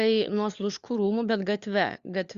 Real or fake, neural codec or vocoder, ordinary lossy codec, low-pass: fake; codec, 16 kHz, 4 kbps, FunCodec, trained on LibriTTS, 50 frames a second; Opus, 64 kbps; 7.2 kHz